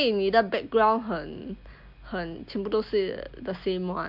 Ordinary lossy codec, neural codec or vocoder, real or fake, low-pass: MP3, 48 kbps; none; real; 5.4 kHz